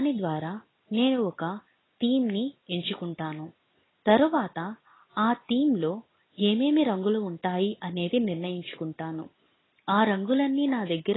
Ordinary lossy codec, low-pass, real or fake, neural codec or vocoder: AAC, 16 kbps; 7.2 kHz; real; none